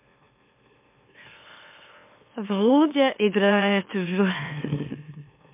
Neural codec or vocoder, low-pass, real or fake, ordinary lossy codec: autoencoder, 44.1 kHz, a latent of 192 numbers a frame, MeloTTS; 3.6 kHz; fake; MP3, 32 kbps